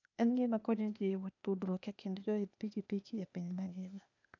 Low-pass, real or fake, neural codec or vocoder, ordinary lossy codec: 7.2 kHz; fake; codec, 16 kHz, 0.8 kbps, ZipCodec; none